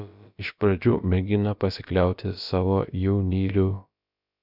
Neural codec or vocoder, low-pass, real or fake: codec, 16 kHz, about 1 kbps, DyCAST, with the encoder's durations; 5.4 kHz; fake